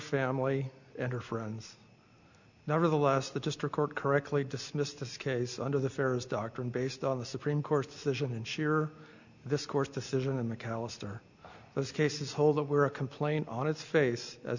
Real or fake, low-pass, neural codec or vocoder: real; 7.2 kHz; none